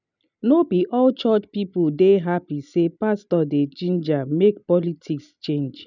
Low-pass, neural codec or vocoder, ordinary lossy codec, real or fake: 7.2 kHz; none; none; real